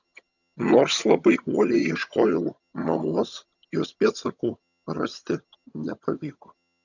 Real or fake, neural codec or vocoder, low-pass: fake; vocoder, 22.05 kHz, 80 mel bands, HiFi-GAN; 7.2 kHz